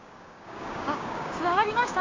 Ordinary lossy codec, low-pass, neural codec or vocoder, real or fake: MP3, 48 kbps; 7.2 kHz; codec, 16 kHz, 6 kbps, DAC; fake